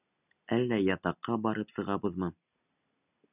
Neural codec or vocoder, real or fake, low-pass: none; real; 3.6 kHz